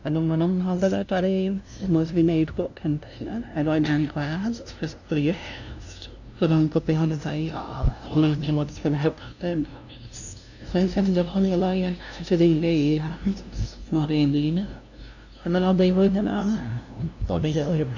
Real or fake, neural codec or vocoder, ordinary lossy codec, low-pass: fake; codec, 16 kHz, 0.5 kbps, FunCodec, trained on LibriTTS, 25 frames a second; none; 7.2 kHz